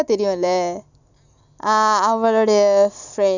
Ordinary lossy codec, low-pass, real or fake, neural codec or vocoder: none; 7.2 kHz; real; none